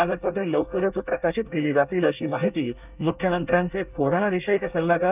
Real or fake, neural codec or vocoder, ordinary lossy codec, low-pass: fake; codec, 24 kHz, 1 kbps, SNAC; none; 3.6 kHz